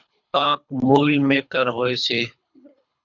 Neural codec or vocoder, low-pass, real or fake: codec, 24 kHz, 3 kbps, HILCodec; 7.2 kHz; fake